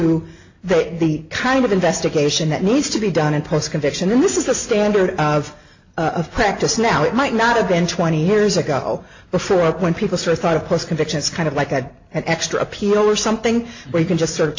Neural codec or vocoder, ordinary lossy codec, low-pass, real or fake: none; AAC, 48 kbps; 7.2 kHz; real